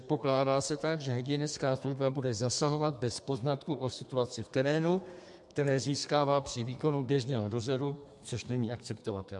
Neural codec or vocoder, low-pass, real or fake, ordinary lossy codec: codec, 32 kHz, 1.9 kbps, SNAC; 10.8 kHz; fake; MP3, 64 kbps